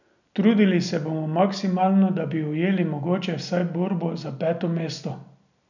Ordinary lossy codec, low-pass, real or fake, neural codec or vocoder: none; 7.2 kHz; real; none